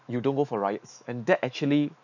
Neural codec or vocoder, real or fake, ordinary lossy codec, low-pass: autoencoder, 48 kHz, 128 numbers a frame, DAC-VAE, trained on Japanese speech; fake; none; 7.2 kHz